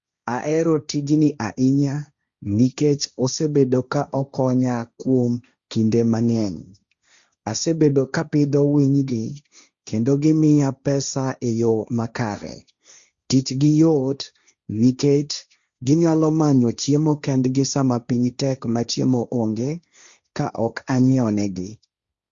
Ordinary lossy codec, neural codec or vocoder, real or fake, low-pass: Opus, 64 kbps; codec, 16 kHz, 1.1 kbps, Voila-Tokenizer; fake; 7.2 kHz